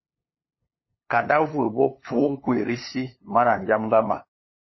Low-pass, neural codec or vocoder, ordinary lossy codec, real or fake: 7.2 kHz; codec, 16 kHz, 2 kbps, FunCodec, trained on LibriTTS, 25 frames a second; MP3, 24 kbps; fake